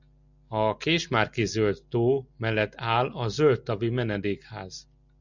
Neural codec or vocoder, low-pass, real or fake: none; 7.2 kHz; real